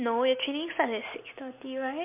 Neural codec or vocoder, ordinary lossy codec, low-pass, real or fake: none; MP3, 32 kbps; 3.6 kHz; real